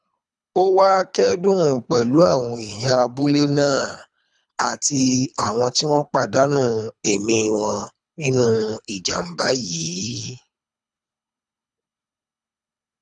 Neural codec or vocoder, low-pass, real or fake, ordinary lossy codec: codec, 24 kHz, 3 kbps, HILCodec; 10.8 kHz; fake; none